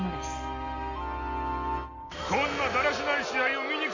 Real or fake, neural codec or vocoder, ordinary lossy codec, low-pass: real; none; MP3, 64 kbps; 7.2 kHz